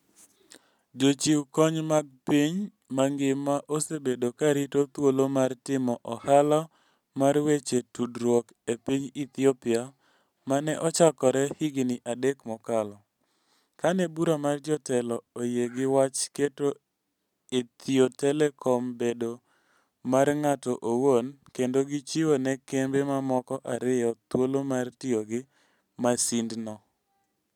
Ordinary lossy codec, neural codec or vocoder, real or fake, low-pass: none; none; real; 19.8 kHz